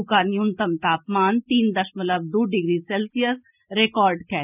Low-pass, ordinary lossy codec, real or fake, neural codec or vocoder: 3.6 kHz; none; real; none